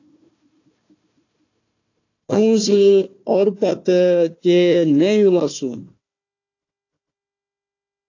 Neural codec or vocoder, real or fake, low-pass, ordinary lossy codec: codec, 16 kHz, 1 kbps, FunCodec, trained on Chinese and English, 50 frames a second; fake; 7.2 kHz; AAC, 48 kbps